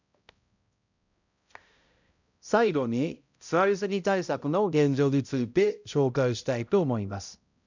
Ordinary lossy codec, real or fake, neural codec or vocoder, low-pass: none; fake; codec, 16 kHz, 0.5 kbps, X-Codec, HuBERT features, trained on balanced general audio; 7.2 kHz